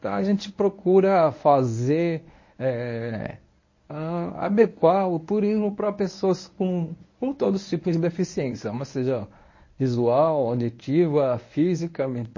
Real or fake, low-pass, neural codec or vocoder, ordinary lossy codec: fake; 7.2 kHz; codec, 24 kHz, 0.9 kbps, WavTokenizer, medium speech release version 1; MP3, 32 kbps